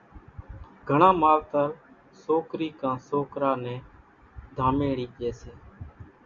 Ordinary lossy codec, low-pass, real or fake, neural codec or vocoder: Opus, 64 kbps; 7.2 kHz; real; none